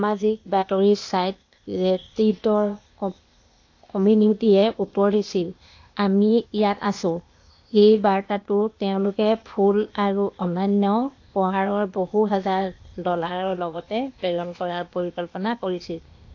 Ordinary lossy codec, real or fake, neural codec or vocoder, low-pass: AAC, 48 kbps; fake; codec, 16 kHz, 0.8 kbps, ZipCodec; 7.2 kHz